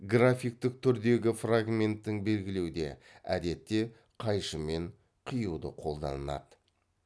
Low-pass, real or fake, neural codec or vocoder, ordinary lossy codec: none; real; none; none